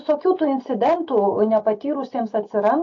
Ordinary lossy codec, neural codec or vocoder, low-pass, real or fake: AAC, 48 kbps; none; 7.2 kHz; real